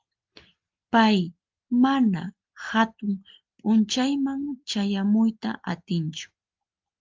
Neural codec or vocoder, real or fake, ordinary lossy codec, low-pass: none; real; Opus, 32 kbps; 7.2 kHz